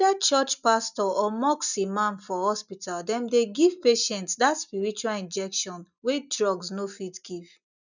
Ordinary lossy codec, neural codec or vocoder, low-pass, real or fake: none; none; 7.2 kHz; real